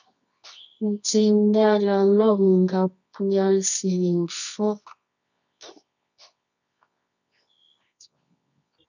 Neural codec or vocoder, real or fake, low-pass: codec, 24 kHz, 0.9 kbps, WavTokenizer, medium music audio release; fake; 7.2 kHz